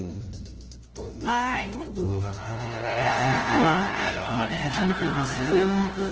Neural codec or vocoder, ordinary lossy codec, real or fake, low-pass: codec, 16 kHz, 0.5 kbps, FunCodec, trained on LibriTTS, 25 frames a second; Opus, 16 kbps; fake; 7.2 kHz